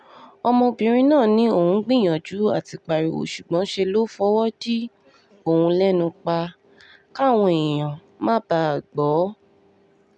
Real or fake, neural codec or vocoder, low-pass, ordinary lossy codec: real; none; none; none